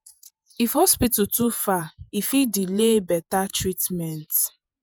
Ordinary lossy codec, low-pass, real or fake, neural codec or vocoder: none; none; fake; vocoder, 48 kHz, 128 mel bands, Vocos